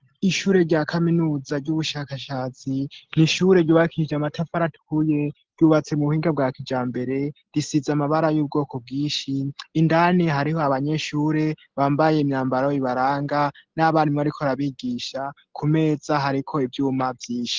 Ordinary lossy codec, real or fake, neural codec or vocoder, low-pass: Opus, 16 kbps; real; none; 7.2 kHz